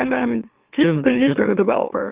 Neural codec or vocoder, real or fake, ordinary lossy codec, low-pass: autoencoder, 44.1 kHz, a latent of 192 numbers a frame, MeloTTS; fake; Opus, 32 kbps; 3.6 kHz